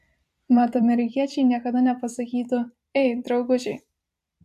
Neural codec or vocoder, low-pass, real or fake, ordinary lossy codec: vocoder, 44.1 kHz, 128 mel bands every 256 samples, BigVGAN v2; 14.4 kHz; fake; AAC, 96 kbps